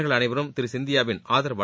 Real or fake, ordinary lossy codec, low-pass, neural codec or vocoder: real; none; none; none